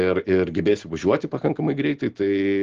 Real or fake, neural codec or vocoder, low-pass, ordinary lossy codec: real; none; 7.2 kHz; Opus, 16 kbps